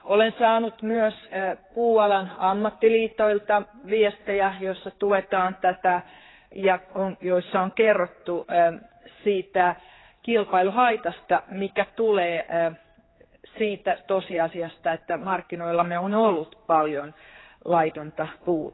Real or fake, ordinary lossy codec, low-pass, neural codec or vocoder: fake; AAC, 16 kbps; 7.2 kHz; codec, 16 kHz, 4 kbps, X-Codec, HuBERT features, trained on general audio